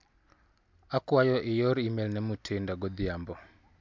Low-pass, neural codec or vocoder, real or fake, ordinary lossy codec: 7.2 kHz; none; real; MP3, 64 kbps